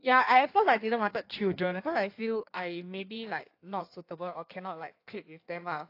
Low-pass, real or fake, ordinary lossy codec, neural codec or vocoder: 5.4 kHz; fake; AAC, 32 kbps; codec, 16 kHz in and 24 kHz out, 1.1 kbps, FireRedTTS-2 codec